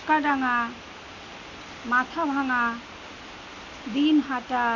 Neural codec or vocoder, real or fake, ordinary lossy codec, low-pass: none; real; none; 7.2 kHz